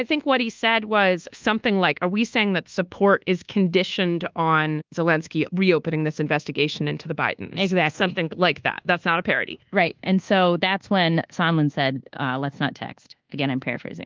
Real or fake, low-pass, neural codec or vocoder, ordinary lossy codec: fake; 7.2 kHz; codec, 24 kHz, 1.2 kbps, DualCodec; Opus, 32 kbps